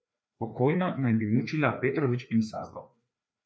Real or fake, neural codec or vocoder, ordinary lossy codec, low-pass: fake; codec, 16 kHz, 2 kbps, FreqCodec, larger model; none; none